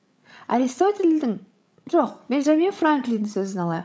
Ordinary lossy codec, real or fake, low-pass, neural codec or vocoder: none; fake; none; codec, 16 kHz, 16 kbps, FreqCodec, larger model